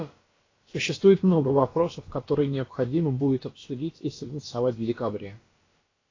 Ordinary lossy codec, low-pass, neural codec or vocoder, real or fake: AAC, 32 kbps; 7.2 kHz; codec, 16 kHz, about 1 kbps, DyCAST, with the encoder's durations; fake